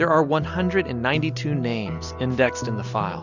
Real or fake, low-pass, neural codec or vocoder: real; 7.2 kHz; none